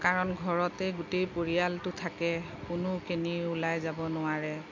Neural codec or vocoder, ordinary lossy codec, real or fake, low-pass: none; MP3, 48 kbps; real; 7.2 kHz